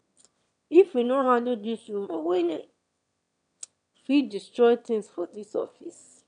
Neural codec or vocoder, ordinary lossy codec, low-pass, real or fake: autoencoder, 22.05 kHz, a latent of 192 numbers a frame, VITS, trained on one speaker; none; 9.9 kHz; fake